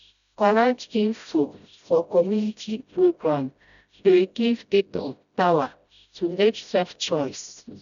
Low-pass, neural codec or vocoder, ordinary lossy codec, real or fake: 7.2 kHz; codec, 16 kHz, 0.5 kbps, FreqCodec, smaller model; none; fake